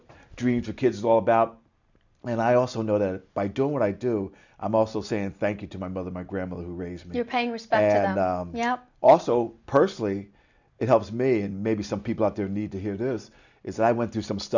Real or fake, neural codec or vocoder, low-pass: real; none; 7.2 kHz